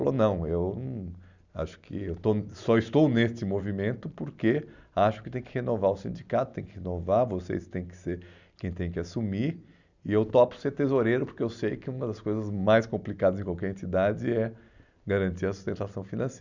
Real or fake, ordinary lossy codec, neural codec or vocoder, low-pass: real; none; none; 7.2 kHz